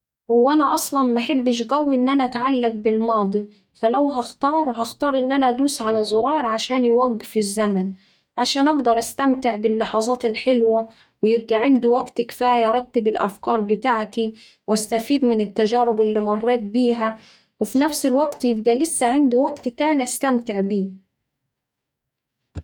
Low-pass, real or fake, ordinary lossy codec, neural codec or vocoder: 19.8 kHz; fake; none; codec, 44.1 kHz, 2.6 kbps, DAC